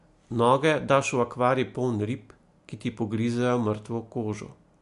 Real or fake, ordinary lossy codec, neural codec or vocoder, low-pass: real; MP3, 64 kbps; none; 10.8 kHz